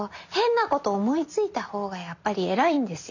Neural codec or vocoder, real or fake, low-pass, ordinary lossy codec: none; real; 7.2 kHz; none